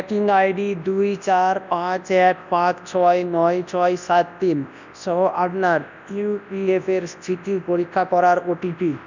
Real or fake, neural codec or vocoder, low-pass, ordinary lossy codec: fake; codec, 24 kHz, 0.9 kbps, WavTokenizer, large speech release; 7.2 kHz; none